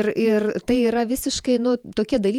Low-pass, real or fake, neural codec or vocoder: 19.8 kHz; fake; vocoder, 48 kHz, 128 mel bands, Vocos